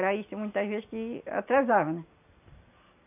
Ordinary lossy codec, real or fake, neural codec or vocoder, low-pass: none; real; none; 3.6 kHz